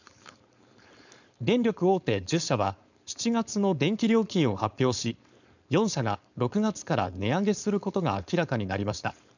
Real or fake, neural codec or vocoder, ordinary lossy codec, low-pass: fake; codec, 16 kHz, 4.8 kbps, FACodec; none; 7.2 kHz